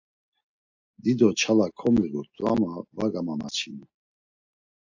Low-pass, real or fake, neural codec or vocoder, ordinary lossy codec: 7.2 kHz; real; none; AAC, 48 kbps